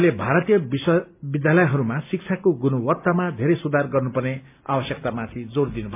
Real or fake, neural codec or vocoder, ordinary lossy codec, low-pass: real; none; MP3, 32 kbps; 3.6 kHz